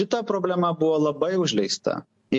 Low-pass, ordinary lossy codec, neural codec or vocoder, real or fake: 10.8 kHz; MP3, 48 kbps; none; real